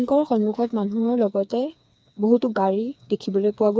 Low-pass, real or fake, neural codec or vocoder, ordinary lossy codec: none; fake; codec, 16 kHz, 4 kbps, FreqCodec, smaller model; none